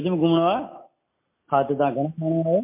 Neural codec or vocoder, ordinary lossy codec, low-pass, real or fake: none; MP3, 24 kbps; 3.6 kHz; real